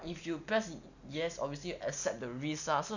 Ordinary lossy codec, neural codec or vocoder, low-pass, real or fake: none; none; 7.2 kHz; real